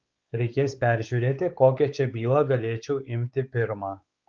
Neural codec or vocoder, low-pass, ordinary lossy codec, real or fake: codec, 16 kHz, 4 kbps, X-Codec, WavLM features, trained on Multilingual LibriSpeech; 7.2 kHz; Opus, 24 kbps; fake